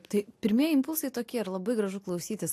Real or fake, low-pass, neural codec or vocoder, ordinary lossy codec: real; 14.4 kHz; none; AAC, 64 kbps